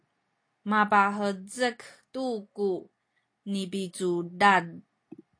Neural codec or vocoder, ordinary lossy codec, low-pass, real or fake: none; AAC, 48 kbps; 9.9 kHz; real